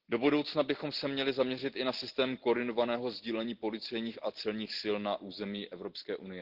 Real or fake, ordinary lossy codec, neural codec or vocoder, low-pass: real; Opus, 16 kbps; none; 5.4 kHz